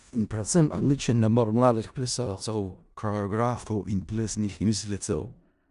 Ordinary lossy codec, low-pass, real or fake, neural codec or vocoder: none; 10.8 kHz; fake; codec, 16 kHz in and 24 kHz out, 0.4 kbps, LongCat-Audio-Codec, four codebook decoder